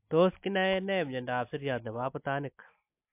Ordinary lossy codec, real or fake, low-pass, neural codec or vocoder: MP3, 32 kbps; real; 3.6 kHz; none